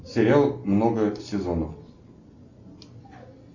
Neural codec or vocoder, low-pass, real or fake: none; 7.2 kHz; real